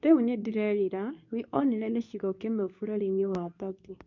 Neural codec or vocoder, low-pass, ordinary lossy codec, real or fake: codec, 24 kHz, 0.9 kbps, WavTokenizer, medium speech release version 2; 7.2 kHz; MP3, 64 kbps; fake